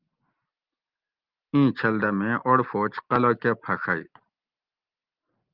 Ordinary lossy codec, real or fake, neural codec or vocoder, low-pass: Opus, 32 kbps; real; none; 5.4 kHz